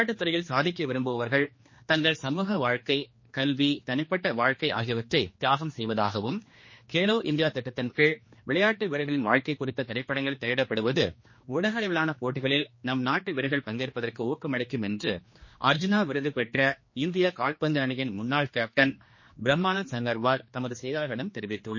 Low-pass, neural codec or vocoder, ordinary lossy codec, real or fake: 7.2 kHz; codec, 16 kHz, 2 kbps, X-Codec, HuBERT features, trained on general audio; MP3, 32 kbps; fake